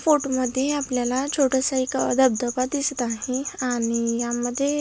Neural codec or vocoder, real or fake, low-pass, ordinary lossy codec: none; real; none; none